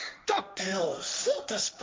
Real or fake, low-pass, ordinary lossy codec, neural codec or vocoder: fake; none; none; codec, 16 kHz, 1.1 kbps, Voila-Tokenizer